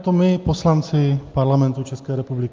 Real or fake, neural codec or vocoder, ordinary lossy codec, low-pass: real; none; Opus, 24 kbps; 7.2 kHz